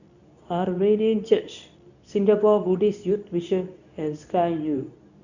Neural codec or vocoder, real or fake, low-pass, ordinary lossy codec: codec, 24 kHz, 0.9 kbps, WavTokenizer, medium speech release version 2; fake; 7.2 kHz; none